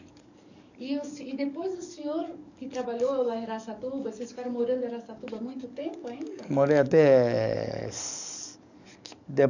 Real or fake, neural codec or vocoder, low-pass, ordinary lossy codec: fake; codec, 44.1 kHz, 7.8 kbps, DAC; 7.2 kHz; none